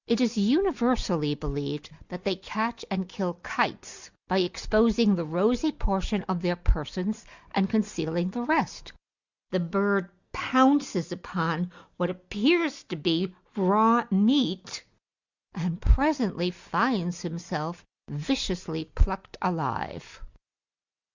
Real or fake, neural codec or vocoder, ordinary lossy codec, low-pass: real; none; Opus, 64 kbps; 7.2 kHz